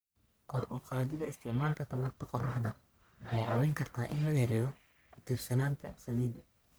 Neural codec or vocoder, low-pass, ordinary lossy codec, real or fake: codec, 44.1 kHz, 1.7 kbps, Pupu-Codec; none; none; fake